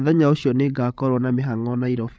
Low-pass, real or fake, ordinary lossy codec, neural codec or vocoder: none; fake; none; codec, 16 kHz, 8 kbps, FreqCodec, larger model